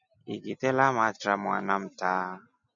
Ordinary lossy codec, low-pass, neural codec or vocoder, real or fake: MP3, 64 kbps; 7.2 kHz; none; real